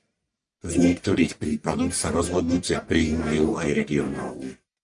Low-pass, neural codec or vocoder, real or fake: 10.8 kHz; codec, 44.1 kHz, 1.7 kbps, Pupu-Codec; fake